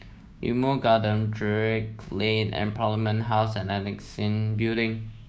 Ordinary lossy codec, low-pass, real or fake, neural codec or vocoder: none; none; fake; codec, 16 kHz, 6 kbps, DAC